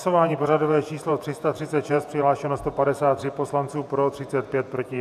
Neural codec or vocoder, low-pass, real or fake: vocoder, 48 kHz, 128 mel bands, Vocos; 14.4 kHz; fake